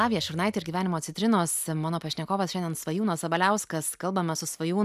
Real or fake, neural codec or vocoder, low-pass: real; none; 14.4 kHz